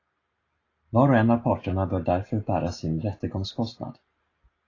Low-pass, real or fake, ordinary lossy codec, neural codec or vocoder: 7.2 kHz; real; AAC, 32 kbps; none